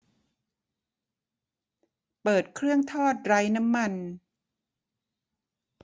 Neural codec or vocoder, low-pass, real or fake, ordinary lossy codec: none; none; real; none